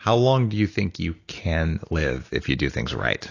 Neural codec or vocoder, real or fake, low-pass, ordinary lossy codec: none; real; 7.2 kHz; AAC, 32 kbps